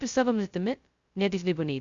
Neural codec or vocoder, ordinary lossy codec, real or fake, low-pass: codec, 16 kHz, 0.2 kbps, FocalCodec; Opus, 64 kbps; fake; 7.2 kHz